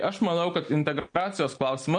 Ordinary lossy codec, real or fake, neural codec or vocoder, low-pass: MP3, 48 kbps; real; none; 9.9 kHz